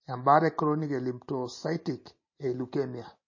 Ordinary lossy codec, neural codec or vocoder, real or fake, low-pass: MP3, 32 kbps; none; real; 7.2 kHz